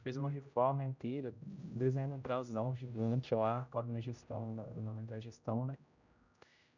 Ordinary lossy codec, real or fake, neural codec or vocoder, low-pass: none; fake; codec, 16 kHz, 0.5 kbps, X-Codec, HuBERT features, trained on general audio; 7.2 kHz